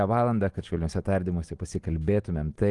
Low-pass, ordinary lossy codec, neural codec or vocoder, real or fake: 10.8 kHz; Opus, 24 kbps; none; real